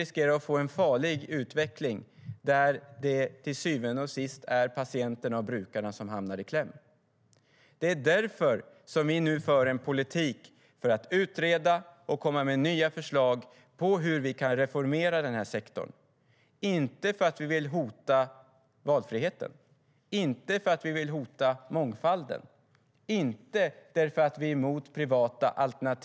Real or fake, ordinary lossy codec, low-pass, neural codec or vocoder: real; none; none; none